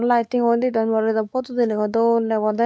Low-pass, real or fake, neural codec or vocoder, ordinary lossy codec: none; fake; codec, 16 kHz, 4 kbps, X-Codec, WavLM features, trained on Multilingual LibriSpeech; none